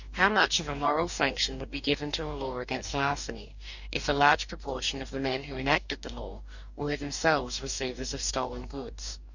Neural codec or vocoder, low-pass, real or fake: codec, 44.1 kHz, 2.6 kbps, DAC; 7.2 kHz; fake